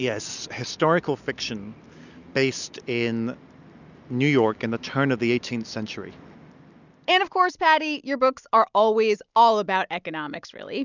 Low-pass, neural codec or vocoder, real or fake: 7.2 kHz; none; real